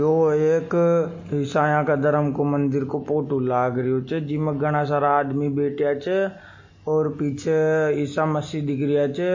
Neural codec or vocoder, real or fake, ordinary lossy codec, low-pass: none; real; MP3, 32 kbps; 7.2 kHz